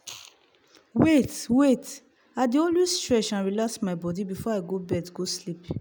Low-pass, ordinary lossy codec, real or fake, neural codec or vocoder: none; none; real; none